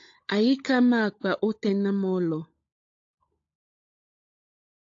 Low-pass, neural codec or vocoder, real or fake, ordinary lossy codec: 7.2 kHz; codec, 16 kHz, 16 kbps, FunCodec, trained on LibriTTS, 50 frames a second; fake; AAC, 48 kbps